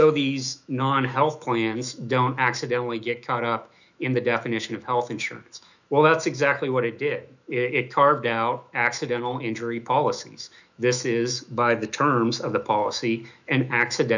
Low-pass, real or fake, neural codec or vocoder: 7.2 kHz; fake; autoencoder, 48 kHz, 128 numbers a frame, DAC-VAE, trained on Japanese speech